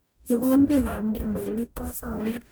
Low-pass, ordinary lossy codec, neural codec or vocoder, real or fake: none; none; codec, 44.1 kHz, 0.9 kbps, DAC; fake